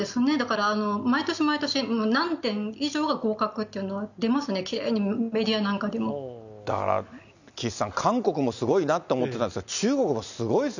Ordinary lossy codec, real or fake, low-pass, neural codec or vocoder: none; real; 7.2 kHz; none